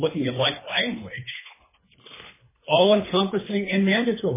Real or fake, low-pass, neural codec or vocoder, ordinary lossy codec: fake; 3.6 kHz; codec, 24 kHz, 3 kbps, HILCodec; MP3, 16 kbps